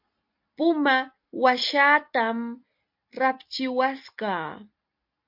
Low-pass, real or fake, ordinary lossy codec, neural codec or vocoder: 5.4 kHz; real; MP3, 48 kbps; none